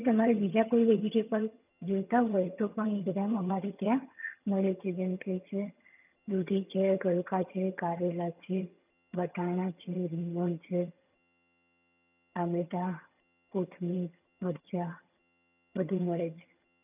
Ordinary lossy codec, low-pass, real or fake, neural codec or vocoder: none; 3.6 kHz; fake; vocoder, 22.05 kHz, 80 mel bands, HiFi-GAN